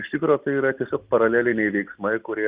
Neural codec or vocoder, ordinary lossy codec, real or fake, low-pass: none; Opus, 24 kbps; real; 3.6 kHz